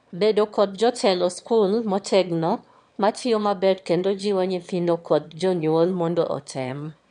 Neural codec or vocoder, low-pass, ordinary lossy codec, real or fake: autoencoder, 22.05 kHz, a latent of 192 numbers a frame, VITS, trained on one speaker; 9.9 kHz; none; fake